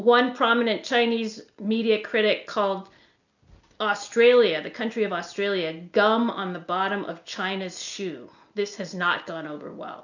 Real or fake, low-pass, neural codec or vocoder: real; 7.2 kHz; none